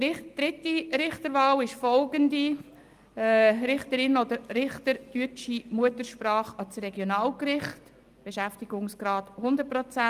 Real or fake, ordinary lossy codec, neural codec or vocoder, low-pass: real; Opus, 32 kbps; none; 14.4 kHz